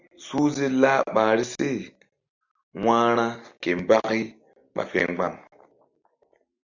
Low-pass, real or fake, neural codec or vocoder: 7.2 kHz; real; none